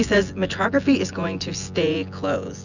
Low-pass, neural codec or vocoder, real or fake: 7.2 kHz; vocoder, 24 kHz, 100 mel bands, Vocos; fake